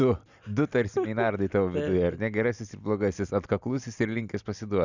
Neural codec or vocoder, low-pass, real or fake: none; 7.2 kHz; real